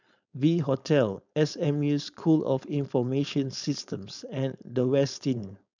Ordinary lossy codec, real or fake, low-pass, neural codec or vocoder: none; fake; 7.2 kHz; codec, 16 kHz, 4.8 kbps, FACodec